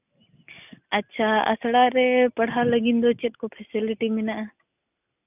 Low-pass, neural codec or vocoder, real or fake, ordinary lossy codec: 3.6 kHz; none; real; none